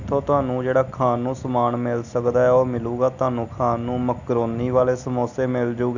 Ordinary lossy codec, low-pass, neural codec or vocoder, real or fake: none; 7.2 kHz; none; real